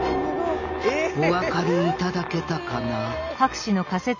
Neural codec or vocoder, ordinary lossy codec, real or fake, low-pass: none; none; real; 7.2 kHz